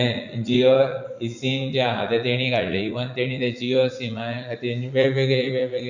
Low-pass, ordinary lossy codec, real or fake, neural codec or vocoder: 7.2 kHz; none; fake; vocoder, 44.1 kHz, 80 mel bands, Vocos